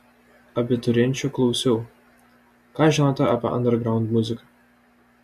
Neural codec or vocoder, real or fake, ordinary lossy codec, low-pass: none; real; MP3, 64 kbps; 14.4 kHz